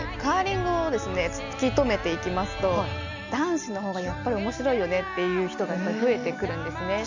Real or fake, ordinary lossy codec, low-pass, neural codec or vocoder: real; none; 7.2 kHz; none